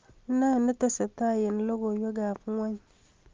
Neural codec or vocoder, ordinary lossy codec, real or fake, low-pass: none; Opus, 24 kbps; real; 7.2 kHz